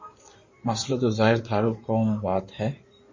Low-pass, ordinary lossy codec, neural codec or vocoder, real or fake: 7.2 kHz; MP3, 32 kbps; codec, 16 kHz in and 24 kHz out, 2.2 kbps, FireRedTTS-2 codec; fake